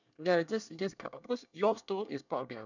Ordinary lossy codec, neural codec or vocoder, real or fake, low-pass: none; codec, 24 kHz, 1 kbps, SNAC; fake; 7.2 kHz